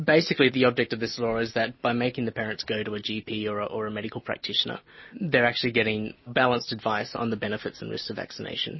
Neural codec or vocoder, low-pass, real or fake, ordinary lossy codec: none; 7.2 kHz; real; MP3, 24 kbps